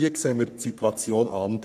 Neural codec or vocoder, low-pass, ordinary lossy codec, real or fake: codec, 44.1 kHz, 3.4 kbps, Pupu-Codec; 14.4 kHz; none; fake